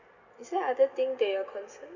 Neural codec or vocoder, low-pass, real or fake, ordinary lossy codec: none; 7.2 kHz; real; none